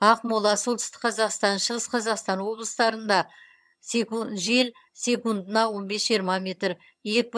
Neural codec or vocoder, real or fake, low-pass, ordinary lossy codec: vocoder, 22.05 kHz, 80 mel bands, HiFi-GAN; fake; none; none